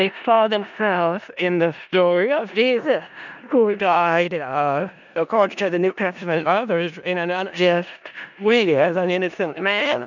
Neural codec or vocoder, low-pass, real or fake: codec, 16 kHz in and 24 kHz out, 0.4 kbps, LongCat-Audio-Codec, four codebook decoder; 7.2 kHz; fake